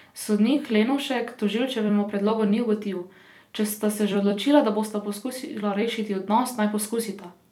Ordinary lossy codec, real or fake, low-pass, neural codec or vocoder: none; fake; 19.8 kHz; vocoder, 44.1 kHz, 128 mel bands every 256 samples, BigVGAN v2